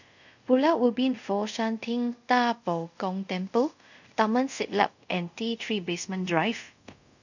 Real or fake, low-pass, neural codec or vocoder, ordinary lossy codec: fake; 7.2 kHz; codec, 24 kHz, 0.5 kbps, DualCodec; none